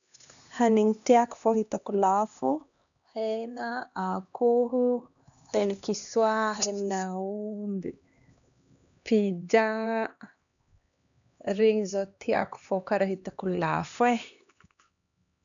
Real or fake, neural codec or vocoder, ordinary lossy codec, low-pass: fake; codec, 16 kHz, 2 kbps, X-Codec, HuBERT features, trained on LibriSpeech; none; 7.2 kHz